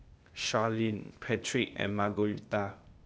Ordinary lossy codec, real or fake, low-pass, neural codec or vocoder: none; fake; none; codec, 16 kHz, 0.8 kbps, ZipCodec